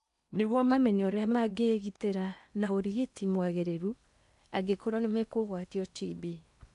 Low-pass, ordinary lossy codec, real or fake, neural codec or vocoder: 10.8 kHz; MP3, 96 kbps; fake; codec, 16 kHz in and 24 kHz out, 0.8 kbps, FocalCodec, streaming, 65536 codes